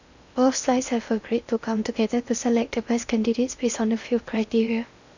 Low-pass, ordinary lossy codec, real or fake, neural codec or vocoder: 7.2 kHz; none; fake; codec, 16 kHz in and 24 kHz out, 0.8 kbps, FocalCodec, streaming, 65536 codes